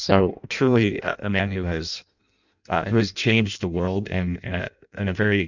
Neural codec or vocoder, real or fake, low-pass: codec, 16 kHz in and 24 kHz out, 0.6 kbps, FireRedTTS-2 codec; fake; 7.2 kHz